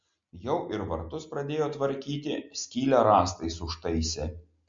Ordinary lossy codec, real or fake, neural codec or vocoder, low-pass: MP3, 48 kbps; real; none; 7.2 kHz